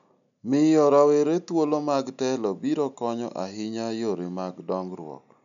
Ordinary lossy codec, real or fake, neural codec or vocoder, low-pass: none; real; none; 7.2 kHz